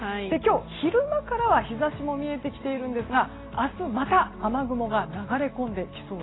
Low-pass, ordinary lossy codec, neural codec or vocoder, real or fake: 7.2 kHz; AAC, 16 kbps; none; real